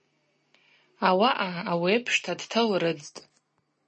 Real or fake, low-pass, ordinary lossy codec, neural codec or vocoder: real; 10.8 kHz; MP3, 32 kbps; none